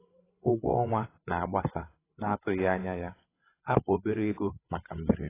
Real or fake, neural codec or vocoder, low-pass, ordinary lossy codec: fake; codec, 16 kHz, 8 kbps, FreqCodec, larger model; 3.6 kHz; AAC, 16 kbps